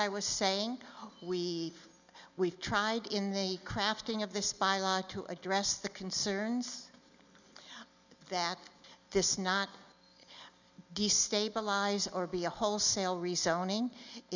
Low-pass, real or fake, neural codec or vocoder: 7.2 kHz; real; none